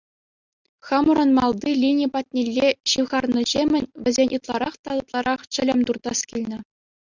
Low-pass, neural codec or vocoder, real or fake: 7.2 kHz; none; real